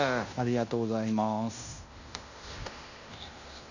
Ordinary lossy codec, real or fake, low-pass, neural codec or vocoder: none; fake; 7.2 kHz; codec, 16 kHz in and 24 kHz out, 0.9 kbps, LongCat-Audio-Codec, fine tuned four codebook decoder